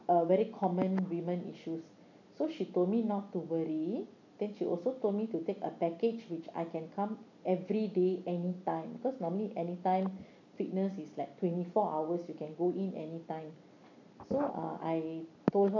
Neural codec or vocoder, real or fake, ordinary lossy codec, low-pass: none; real; none; 7.2 kHz